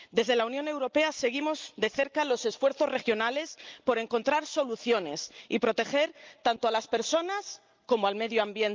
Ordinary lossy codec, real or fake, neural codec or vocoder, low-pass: Opus, 24 kbps; real; none; 7.2 kHz